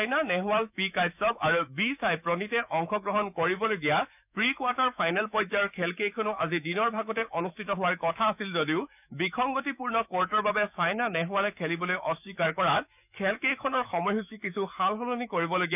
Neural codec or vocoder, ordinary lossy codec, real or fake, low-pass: autoencoder, 48 kHz, 128 numbers a frame, DAC-VAE, trained on Japanese speech; none; fake; 3.6 kHz